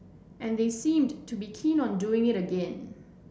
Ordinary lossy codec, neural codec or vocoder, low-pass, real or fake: none; none; none; real